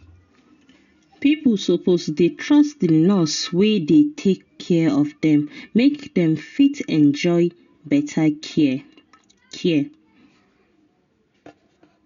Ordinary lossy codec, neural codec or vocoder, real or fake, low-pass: none; none; real; 7.2 kHz